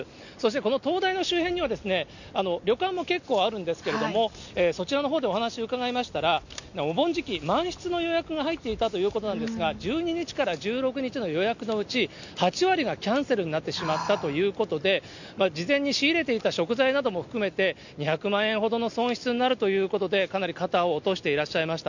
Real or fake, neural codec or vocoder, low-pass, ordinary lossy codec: real; none; 7.2 kHz; none